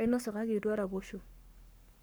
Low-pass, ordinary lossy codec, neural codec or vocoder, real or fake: none; none; codec, 44.1 kHz, 7.8 kbps, Pupu-Codec; fake